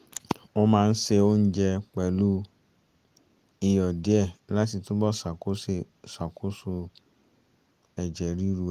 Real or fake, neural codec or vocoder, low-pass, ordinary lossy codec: real; none; 14.4 kHz; Opus, 24 kbps